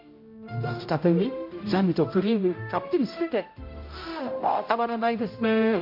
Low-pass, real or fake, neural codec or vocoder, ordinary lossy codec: 5.4 kHz; fake; codec, 16 kHz, 0.5 kbps, X-Codec, HuBERT features, trained on general audio; MP3, 32 kbps